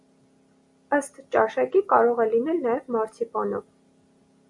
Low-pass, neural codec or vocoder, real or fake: 10.8 kHz; none; real